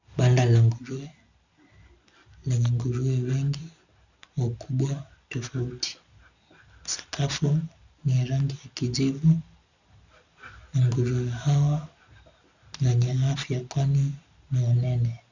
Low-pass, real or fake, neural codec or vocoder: 7.2 kHz; real; none